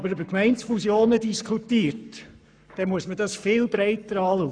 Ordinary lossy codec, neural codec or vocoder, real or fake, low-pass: none; codec, 44.1 kHz, 7.8 kbps, Pupu-Codec; fake; 9.9 kHz